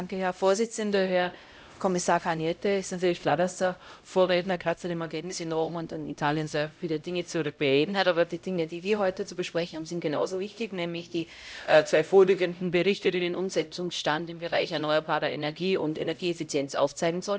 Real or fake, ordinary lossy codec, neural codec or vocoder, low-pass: fake; none; codec, 16 kHz, 0.5 kbps, X-Codec, HuBERT features, trained on LibriSpeech; none